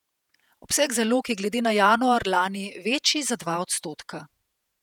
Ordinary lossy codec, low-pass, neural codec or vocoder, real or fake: none; 19.8 kHz; vocoder, 48 kHz, 128 mel bands, Vocos; fake